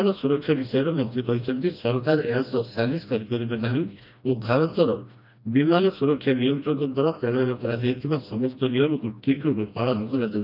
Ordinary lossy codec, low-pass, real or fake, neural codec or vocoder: none; 5.4 kHz; fake; codec, 16 kHz, 1 kbps, FreqCodec, smaller model